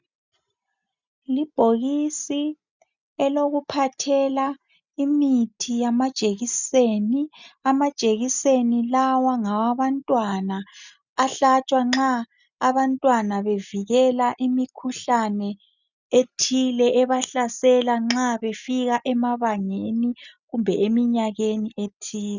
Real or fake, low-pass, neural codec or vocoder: real; 7.2 kHz; none